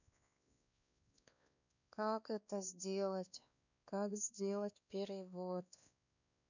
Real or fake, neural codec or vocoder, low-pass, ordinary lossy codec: fake; codec, 16 kHz, 2 kbps, X-Codec, WavLM features, trained on Multilingual LibriSpeech; 7.2 kHz; none